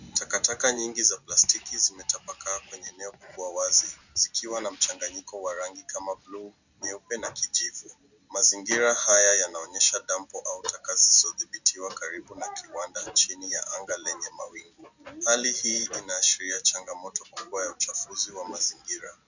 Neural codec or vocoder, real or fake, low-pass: none; real; 7.2 kHz